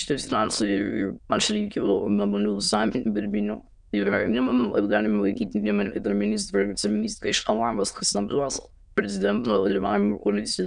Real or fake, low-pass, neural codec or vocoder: fake; 9.9 kHz; autoencoder, 22.05 kHz, a latent of 192 numbers a frame, VITS, trained on many speakers